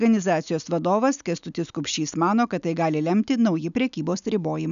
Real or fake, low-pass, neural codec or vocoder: real; 7.2 kHz; none